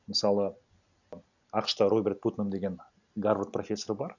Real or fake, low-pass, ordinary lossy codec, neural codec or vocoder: real; 7.2 kHz; none; none